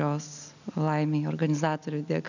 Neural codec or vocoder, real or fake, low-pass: none; real; 7.2 kHz